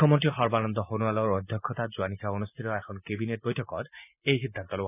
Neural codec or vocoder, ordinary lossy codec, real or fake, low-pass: none; none; real; 3.6 kHz